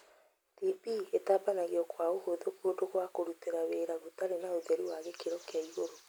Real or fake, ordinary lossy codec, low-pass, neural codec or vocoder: real; none; none; none